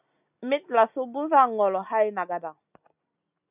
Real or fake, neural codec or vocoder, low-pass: real; none; 3.6 kHz